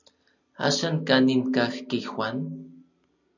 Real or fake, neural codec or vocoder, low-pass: real; none; 7.2 kHz